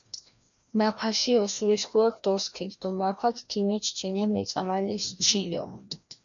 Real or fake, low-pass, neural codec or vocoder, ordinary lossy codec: fake; 7.2 kHz; codec, 16 kHz, 1 kbps, FreqCodec, larger model; MP3, 96 kbps